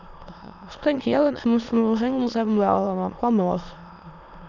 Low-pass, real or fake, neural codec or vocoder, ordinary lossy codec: 7.2 kHz; fake; autoencoder, 22.05 kHz, a latent of 192 numbers a frame, VITS, trained on many speakers; none